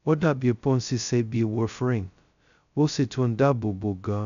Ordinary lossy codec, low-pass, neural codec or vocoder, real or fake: none; 7.2 kHz; codec, 16 kHz, 0.2 kbps, FocalCodec; fake